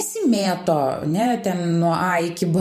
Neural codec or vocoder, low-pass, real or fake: vocoder, 44.1 kHz, 128 mel bands every 512 samples, BigVGAN v2; 14.4 kHz; fake